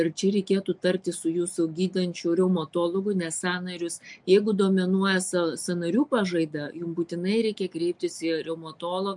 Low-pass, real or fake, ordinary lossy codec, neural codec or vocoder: 10.8 kHz; real; MP3, 64 kbps; none